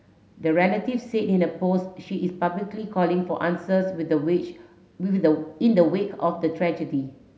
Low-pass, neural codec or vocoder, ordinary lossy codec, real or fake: none; none; none; real